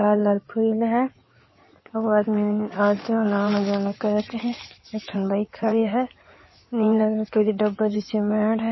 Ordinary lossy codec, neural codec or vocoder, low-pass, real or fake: MP3, 24 kbps; codec, 16 kHz in and 24 kHz out, 2.2 kbps, FireRedTTS-2 codec; 7.2 kHz; fake